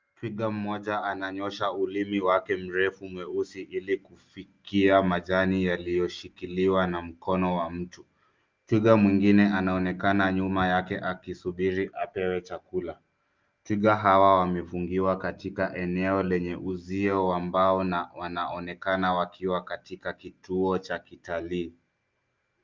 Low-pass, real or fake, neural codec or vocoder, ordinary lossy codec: 7.2 kHz; real; none; Opus, 24 kbps